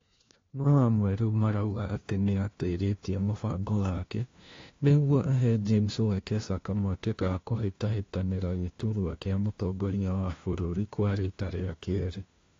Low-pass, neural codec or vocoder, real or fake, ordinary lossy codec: 7.2 kHz; codec, 16 kHz, 1 kbps, FunCodec, trained on LibriTTS, 50 frames a second; fake; AAC, 32 kbps